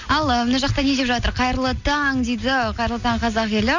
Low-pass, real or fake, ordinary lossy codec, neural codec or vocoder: 7.2 kHz; real; none; none